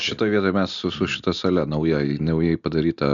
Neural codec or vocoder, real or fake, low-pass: none; real; 7.2 kHz